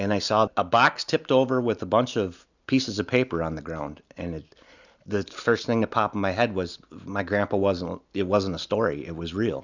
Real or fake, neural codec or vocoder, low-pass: real; none; 7.2 kHz